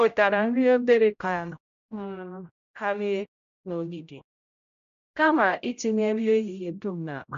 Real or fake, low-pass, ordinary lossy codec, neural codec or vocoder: fake; 7.2 kHz; AAC, 96 kbps; codec, 16 kHz, 0.5 kbps, X-Codec, HuBERT features, trained on general audio